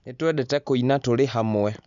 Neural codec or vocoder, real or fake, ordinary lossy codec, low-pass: none; real; none; 7.2 kHz